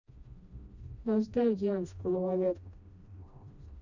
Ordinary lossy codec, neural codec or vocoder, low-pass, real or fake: none; codec, 16 kHz, 1 kbps, FreqCodec, smaller model; 7.2 kHz; fake